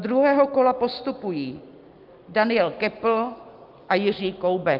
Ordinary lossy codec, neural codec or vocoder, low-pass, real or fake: Opus, 24 kbps; none; 5.4 kHz; real